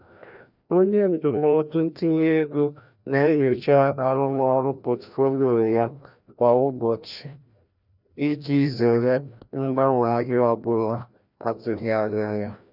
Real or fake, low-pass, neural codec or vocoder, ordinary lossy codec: fake; 5.4 kHz; codec, 16 kHz, 1 kbps, FreqCodec, larger model; none